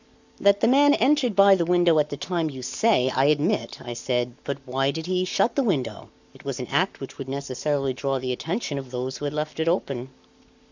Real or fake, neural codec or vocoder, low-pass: fake; codec, 44.1 kHz, 7.8 kbps, Pupu-Codec; 7.2 kHz